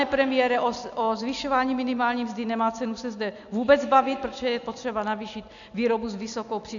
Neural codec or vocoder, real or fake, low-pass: none; real; 7.2 kHz